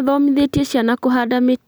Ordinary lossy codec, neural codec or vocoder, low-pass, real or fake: none; none; none; real